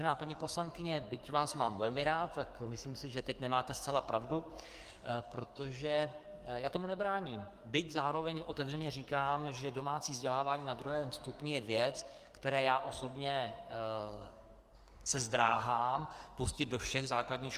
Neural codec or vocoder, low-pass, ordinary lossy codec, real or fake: codec, 44.1 kHz, 2.6 kbps, SNAC; 14.4 kHz; Opus, 32 kbps; fake